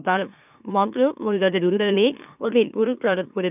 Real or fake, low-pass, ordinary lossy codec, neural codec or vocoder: fake; 3.6 kHz; none; autoencoder, 44.1 kHz, a latent of 192 numbers a frame, MeloTTS